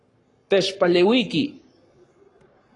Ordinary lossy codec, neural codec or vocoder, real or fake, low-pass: AAC, 32 kbps; codec, 44.1 kHz, 7.8 kbps, Pupu-Codec; fake; 10.8 kHz